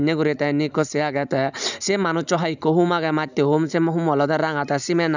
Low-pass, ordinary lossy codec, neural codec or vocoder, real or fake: 7.2 kHz; none; none; real